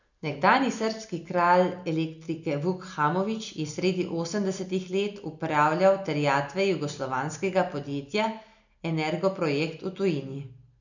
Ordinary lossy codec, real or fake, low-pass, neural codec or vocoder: none; real; 7.2 kHz; none